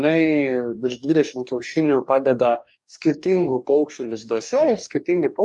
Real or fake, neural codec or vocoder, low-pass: fake; codec, 44.1 kHz, 2.6 kbps, DAC; 10.8 kHz